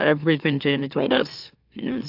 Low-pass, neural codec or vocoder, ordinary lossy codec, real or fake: 5.4 kHz; autoencoder, 44.1 kHz, a latent of 192 numbers a frame, MeloTTS; AAC, 48 kbps; fake